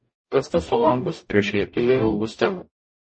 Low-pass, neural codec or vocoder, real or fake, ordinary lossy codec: 9.9 kHz; codec, 44.1 kHz, 0.9 kbps, DAC; fake; MP3, 32 kbps